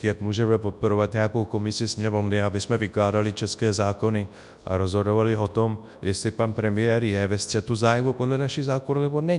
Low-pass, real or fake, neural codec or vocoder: 10.8 kHz; fake; codec, 24 kHz, 0.9 kbps, WavTokenizer, large speech release